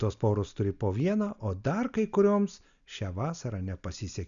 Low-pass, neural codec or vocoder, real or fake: 7.2 kHz; none; real